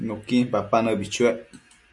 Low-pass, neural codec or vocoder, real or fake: 10.8 kHz; none; real